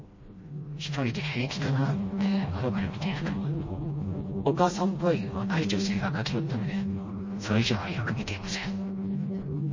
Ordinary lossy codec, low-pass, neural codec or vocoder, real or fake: MP3, 32 kbps; 7.2 kHz; codec, 16 kHz, 1 kbps, FreqCodec, smaller model; fake